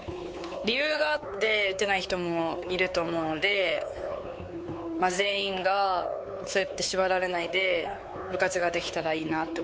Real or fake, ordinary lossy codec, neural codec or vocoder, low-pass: fake; none; codec, 16 kHz, 4 kbps, X-Codec, WavLM features, trained on Multilingual LibriSpeech; none